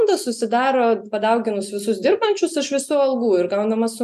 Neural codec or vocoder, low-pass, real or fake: none; 14.4 kHz; real